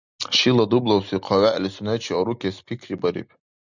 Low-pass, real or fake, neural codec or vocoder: 7.2 kHz; real; none